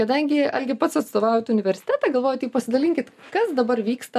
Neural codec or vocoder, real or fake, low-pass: none; real; 14.4 kHz